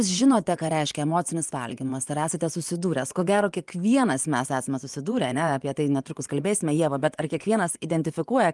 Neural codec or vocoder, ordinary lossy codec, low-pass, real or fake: vocoder, 24 kHz, 100 mel bands, Vocos; Opus, 32 kbps; 10.8 kHz; fake